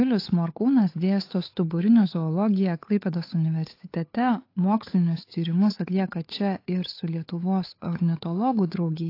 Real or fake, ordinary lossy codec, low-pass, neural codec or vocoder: fake; AAC, 32 kbps; 5.4 kHz; codec, 16 kHz, 8 kbps, FunCodec, trained on Chinese and English, 25 frames a second